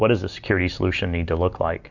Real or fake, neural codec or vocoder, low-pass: real; none; 7.2 kHz